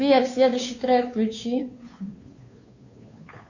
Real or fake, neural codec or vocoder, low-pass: fake; codec, 16 kHz, 2 kbps, FunCodec, trained on Chinese and English, 25 frames a second; 7.2 kHz